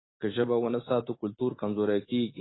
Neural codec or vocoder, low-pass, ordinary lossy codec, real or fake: none; 7.2 kHz; AAC, 16 kbps; real